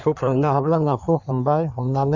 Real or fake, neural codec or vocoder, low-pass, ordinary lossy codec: fake; codec, 16 kHz in and 24 kHz out, 1.1 kbps, FireRedTTS-2 codec; 7.2 kHz; none